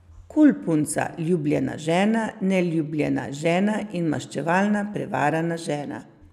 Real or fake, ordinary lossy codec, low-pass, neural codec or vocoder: real; none; 14.4 kHz; none